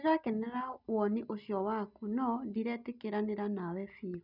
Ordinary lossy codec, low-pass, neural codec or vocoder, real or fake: none; 5.4 kHz; none; real